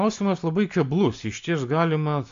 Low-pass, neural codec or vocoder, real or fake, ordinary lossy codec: 7.2 kHz; none; real; AAC, 64 kbps